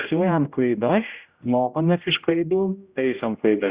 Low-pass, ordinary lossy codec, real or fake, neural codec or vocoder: 3.6 kHz; Opus, 64 kbps; fake; codec, 16 kHz, 0.5 kbps, X-Codec, HuBERT features, trained on general audio